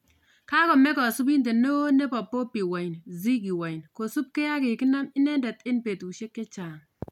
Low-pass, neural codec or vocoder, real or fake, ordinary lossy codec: 19.8 kHz; none; real; none